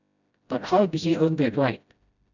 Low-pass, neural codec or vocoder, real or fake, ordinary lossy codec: 7.2 kHz; codec, 16 kHz, 0.5 kbps, FreqCodec, smaller model; fake; none